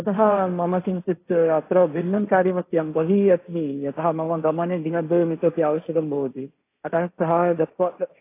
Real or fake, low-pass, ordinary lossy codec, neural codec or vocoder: fake; 3.6 kHz; AAC, 24 kbps; codec, 16 kHz, 1.1 kbps, Voila-Tokenizer